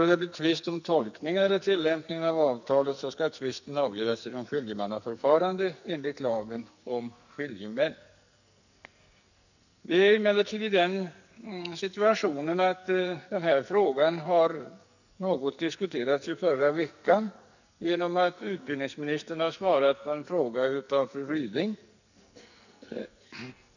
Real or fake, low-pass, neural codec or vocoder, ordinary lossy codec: fake; 7.2 kHz; codec, 44.1 kHz, 2.6 kbps, SNAC; none